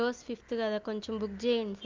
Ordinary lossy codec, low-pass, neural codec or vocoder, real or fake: Opus, 24 kbps; 7.2 kHz; none; real